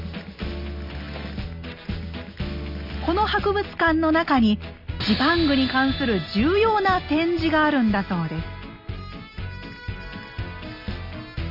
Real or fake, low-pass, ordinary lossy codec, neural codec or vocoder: real; 5.4 kHz; none; none